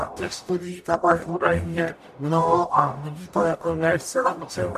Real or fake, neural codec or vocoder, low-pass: fake; codec, 44.1 kHz, 0.9 kbps, DAC; 14.4 kHz